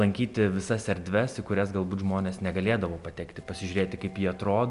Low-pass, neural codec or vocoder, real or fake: 10.8 kHz; none; real